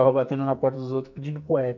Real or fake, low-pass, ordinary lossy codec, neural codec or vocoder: fake; 7.2 kHz; none; codec, 44.1 kHz, 2.6 kbps, SNAC